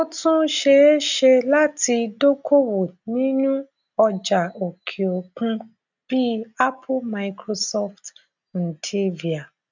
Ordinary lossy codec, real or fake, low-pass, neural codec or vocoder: none; real; 7.2 kHz; none